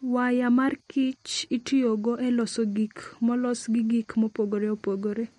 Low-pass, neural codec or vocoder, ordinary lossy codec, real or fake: 19.8 kHz; none; MP3, 48 kbps; real